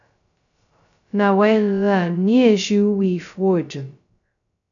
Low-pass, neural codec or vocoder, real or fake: 7.2 kHz; codec, 16 kHz, 0.2 kbps, FocalCodec; fake